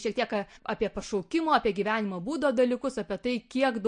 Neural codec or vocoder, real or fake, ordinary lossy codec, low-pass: none; real; MP3, 48 kbps; 9.9 kHz